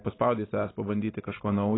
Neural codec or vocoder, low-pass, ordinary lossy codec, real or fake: none; 7.2 kHz; AAC, 16 kbps; real